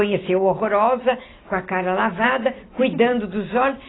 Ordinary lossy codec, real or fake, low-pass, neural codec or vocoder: AAC, 16 kbps; real; 7.2 kHz; none